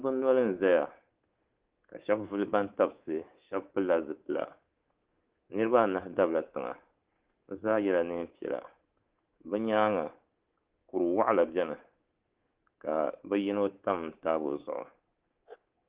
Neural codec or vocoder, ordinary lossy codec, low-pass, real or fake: codec, 24 kHz, 3.1 kbps, DualCodec; Opus, 16 kbps; 3.6 kHz; fake